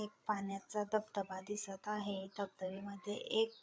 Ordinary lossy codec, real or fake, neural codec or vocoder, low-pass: none; fake; codec, 16 kHz, 8 kbps, FreqCodec, larger model; none